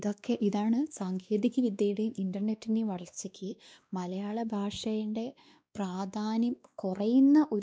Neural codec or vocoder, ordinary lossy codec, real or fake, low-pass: codec, 16 kHz, 2 kbps, X-Codec, WavLM features, trained on Multilingual LibriSpeech; none; fake; none